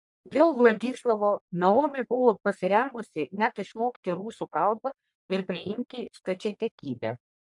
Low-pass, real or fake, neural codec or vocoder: 10.8 kHz; fake; codec, 44.1 kHz, 1.7 kbps, Pupu-Codec